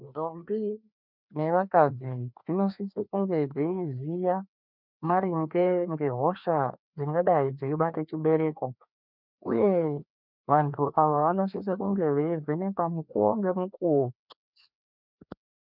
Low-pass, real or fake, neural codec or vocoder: 5.4 kHz; fake; codec, 16 kHz, 2 kbps, FreqCodec, larger model